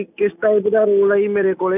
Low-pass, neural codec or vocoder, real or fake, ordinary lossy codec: 3.6 kHz; none; real; none